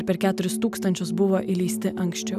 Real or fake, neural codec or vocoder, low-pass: real; none; 14.4 kHz